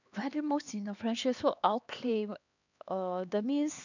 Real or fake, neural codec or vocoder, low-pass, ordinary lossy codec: fake; codec, 16 kHz, 2 kbps, X-Codec, HuBERT features, trained on LibriSpeech; 7.2 kHz; none